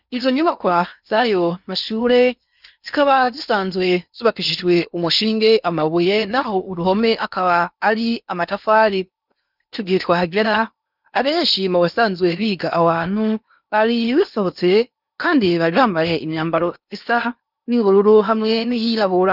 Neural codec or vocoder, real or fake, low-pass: codec, 16 kHz in and 24 kHz out, 0.8 kbps, FocalCodec, streaming, 65536 codes; fake; 5.4 kHz